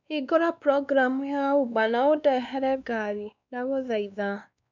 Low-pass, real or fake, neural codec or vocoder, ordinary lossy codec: 7.2 kHz; fake; codec, 16 kHz, 2 kbps, X-Codec, WavLM features, trained on Multilingual LibriSpeech; none